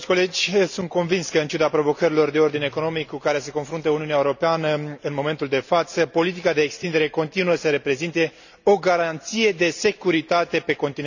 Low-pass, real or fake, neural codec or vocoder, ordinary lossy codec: 7.2 kHz; real; none; none